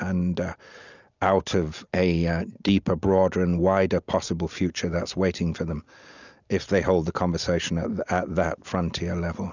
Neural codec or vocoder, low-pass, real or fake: none; 7.2 kHz; real